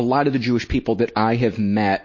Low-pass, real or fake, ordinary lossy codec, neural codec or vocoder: 7.2 kHz; real; MP3, 32 kbps; none